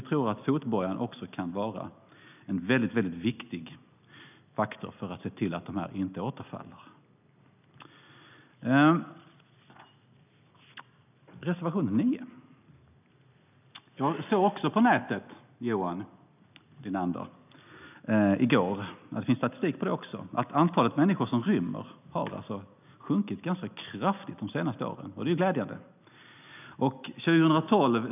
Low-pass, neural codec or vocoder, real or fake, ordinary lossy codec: 3.6 kHz; none; real; none